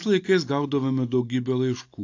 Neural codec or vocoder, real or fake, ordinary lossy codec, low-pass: none; real; AAC, 48 kbps; 7.2 kHz